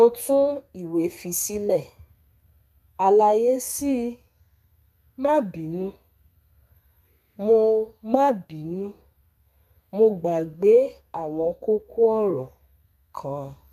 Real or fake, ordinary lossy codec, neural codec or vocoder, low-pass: fake; none; codec, 32 kHz, 1.9 kbps, SNAC; 14.4 kHz